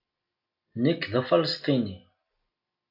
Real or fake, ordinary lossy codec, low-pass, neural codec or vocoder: real; AAC, 32 kbps; 5.4 kHz; none